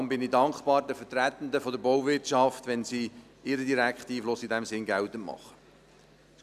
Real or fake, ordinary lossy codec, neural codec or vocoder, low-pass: real; none; none; 14.4 kHz